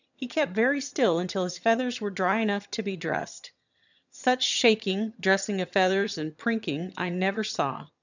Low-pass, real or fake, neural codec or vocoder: 7.2 kHz; fake; vocoder, 22.05 kHz, 80 mel bands, HiFi-GAN